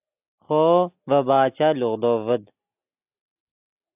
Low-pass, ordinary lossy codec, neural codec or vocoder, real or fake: 3.6 kHz; AAC, 32 kbps; none; real